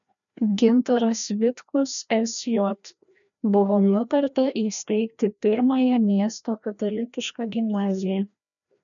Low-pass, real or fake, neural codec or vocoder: 7.2 kHz; fake; codec, 16 kHz, 1 kbps, FreqCodec, larger model